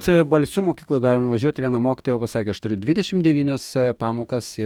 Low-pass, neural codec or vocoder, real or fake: 19.8 kHz; codec, 44.1 kHz, 2.6 kbps, DAC; fake